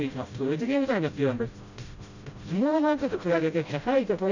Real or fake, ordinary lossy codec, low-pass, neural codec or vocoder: fake; Opus, 64 kbps; 7.2 kHz; codec, 16 kHz, 0.5 kbps, FreqCodec, smaller model